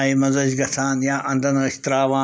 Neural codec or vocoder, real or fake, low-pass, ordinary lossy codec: none; real; none; none